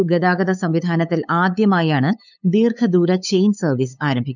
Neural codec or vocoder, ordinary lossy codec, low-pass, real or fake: codec, 16 kHz, 8 kbps, FunCodec, trained on LibriTTS, 25 frames a second; none; 7.2 kHz; fake